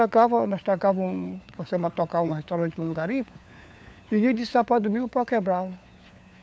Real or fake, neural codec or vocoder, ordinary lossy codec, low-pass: fake; codec, 16 kHz, 4 kbps, FunCodec, trained on LibriTTS, 50 frames a second; none; none